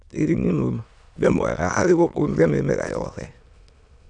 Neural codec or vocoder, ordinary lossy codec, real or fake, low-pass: autoencoder, 22.05 kHz, a latent of 192 numbers a frame, VITS, trained on many speakers; none; fake; 9.9 kHz